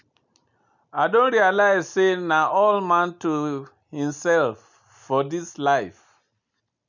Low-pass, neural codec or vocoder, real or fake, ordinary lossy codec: 7.2 kHz; none; real; none